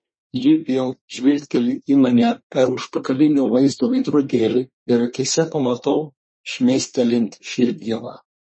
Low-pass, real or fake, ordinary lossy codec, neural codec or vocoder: 9.9 kHz; fake; MP3, 32 kbps; codec, 24 kHz, 1 kbps, SNAC